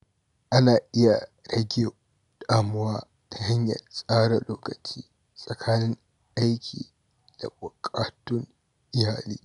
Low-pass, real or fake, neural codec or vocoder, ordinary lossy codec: 10.8 kHz; real; none; none